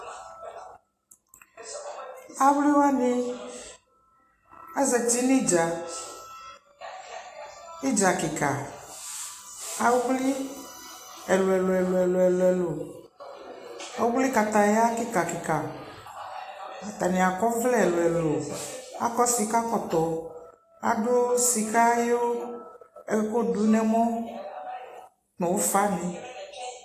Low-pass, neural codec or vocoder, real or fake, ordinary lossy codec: 14.4 kHz; none; real; AAC, 48 kbps